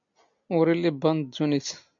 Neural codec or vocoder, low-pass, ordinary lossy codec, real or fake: none; 7.2 kHz; MP3, 96 kbps; real